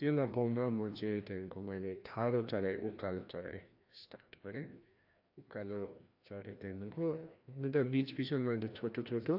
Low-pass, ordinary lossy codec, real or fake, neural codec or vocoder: 5.4 kHz; AAC, 48 kbps; fake; codec, 16 kHz, 1 kbps, FreqCodec, larger model